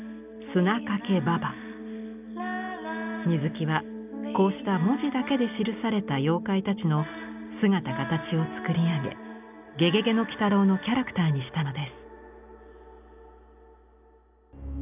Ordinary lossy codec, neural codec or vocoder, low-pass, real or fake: none; none; 3.6 kHz; real